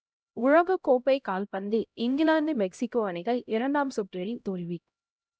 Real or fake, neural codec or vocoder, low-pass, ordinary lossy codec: fake; codec, 16 kHz, 0.5 kbps, X-Codec, HuBERT features, trained on LibriSpeech; none; none